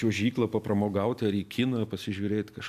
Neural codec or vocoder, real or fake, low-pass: none; real; 14.4 kHz